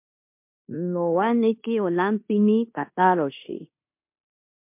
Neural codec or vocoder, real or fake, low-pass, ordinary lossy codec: codec, 16 kHz in and 24 kHz out, 0.9 kbps, LongCat-Audio-Codec, four codebook decoder; fake; 3.6 kHz; MP3, 32 kbps